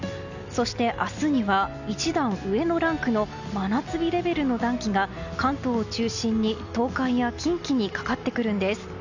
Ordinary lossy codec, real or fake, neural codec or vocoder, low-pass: none; real; none; 7.2 kHz